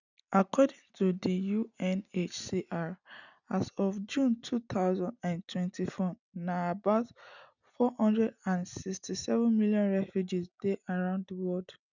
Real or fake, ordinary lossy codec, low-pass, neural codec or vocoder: real; none; 7.2 kHz; none